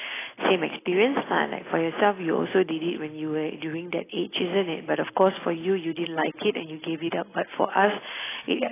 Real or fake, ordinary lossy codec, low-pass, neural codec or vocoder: real; AAC, 16 kbps; 3.6 kHz; none